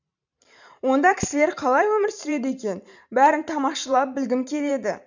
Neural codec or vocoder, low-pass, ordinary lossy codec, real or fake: vocoder, 44.1 kHz, 128 mel bands every 512 samples, BigVGAN v2; 7.2 kHz; none; fake